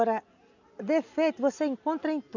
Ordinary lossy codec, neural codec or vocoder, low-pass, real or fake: none; none; 7.2 kHz; real